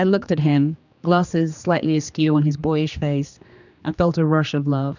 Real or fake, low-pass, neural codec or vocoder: fake; 7.2 kHz; codec, 16 kHz, 2 kbps, X-Codec, HuBERT features, trained on general audio